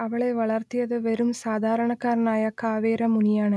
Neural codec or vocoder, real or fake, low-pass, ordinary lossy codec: none; real; none; none